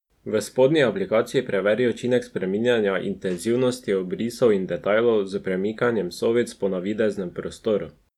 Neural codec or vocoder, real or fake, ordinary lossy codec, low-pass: none; real; none; 19.8 kHz